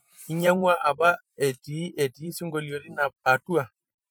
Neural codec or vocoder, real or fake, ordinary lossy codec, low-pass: vocoder, 44.1 kHz, 128 mel bands every 512 samples, BigVGAN v2; fake; none; none